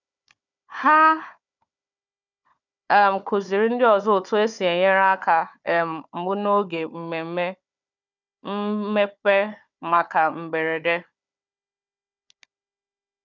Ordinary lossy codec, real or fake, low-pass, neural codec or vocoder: none; fake; 7.2 kHz; codec, 16 kHz, 4 kbps, FunCodec, trained on Chinese and English, 50 frames a second